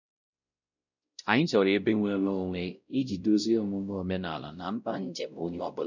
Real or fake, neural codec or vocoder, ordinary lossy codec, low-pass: fake; codec, 16 kHz, 0.5 kbps, X-Codec, WavLM features, trained on Multilingual LibriSpeech; none; 7.2 kHz